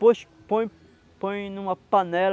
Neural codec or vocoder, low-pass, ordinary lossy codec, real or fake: none; none; none; real